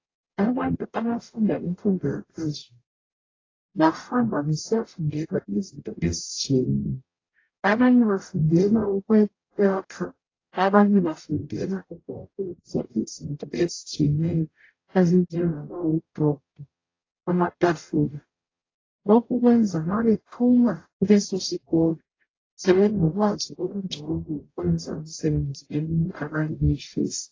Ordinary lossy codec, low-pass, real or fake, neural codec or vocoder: AAC, 32 kbps; 7.2 kHz; fake; codec, 44.1 kHz, 0.9 kbps, DAC